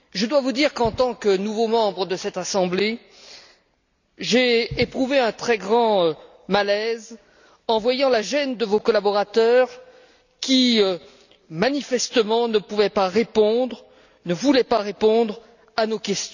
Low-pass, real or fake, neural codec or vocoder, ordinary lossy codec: 7.2 kHz; real; none; none